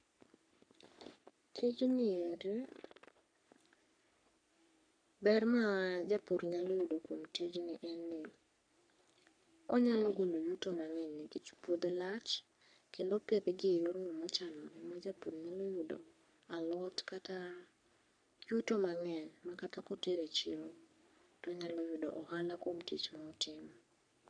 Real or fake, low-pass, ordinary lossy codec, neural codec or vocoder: fake; 9.9 kHz; none; codec, 44.1 kHz, 3.4 kbps, Pupu-Codec